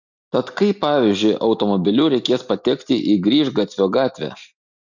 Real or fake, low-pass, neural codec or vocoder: real; 7.2 kHz; none